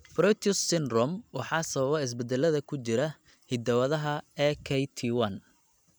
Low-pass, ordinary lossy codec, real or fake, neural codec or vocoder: none; none; real; none